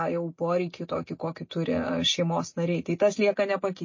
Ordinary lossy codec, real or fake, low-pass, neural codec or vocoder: MP3, 32 kbps; real; 7.2 kHz; none